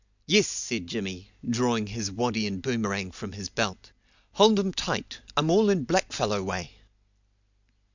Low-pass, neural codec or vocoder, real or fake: 7.2 kHz; none; real